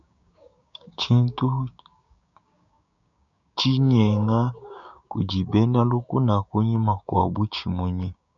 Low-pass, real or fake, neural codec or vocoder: 7.2 kHz; fake; codec, 16 kHz, 6 kbps, DAC